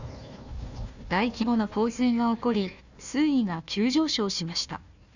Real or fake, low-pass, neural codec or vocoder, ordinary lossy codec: fake; 7.2 kHz; codec, 16 kHz, 1 kbps, FunCodec, trained on Chinese and English, 50 frames a second; none